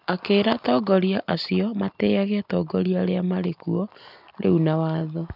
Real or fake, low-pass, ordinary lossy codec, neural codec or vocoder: real; 5.4 kHz; none; none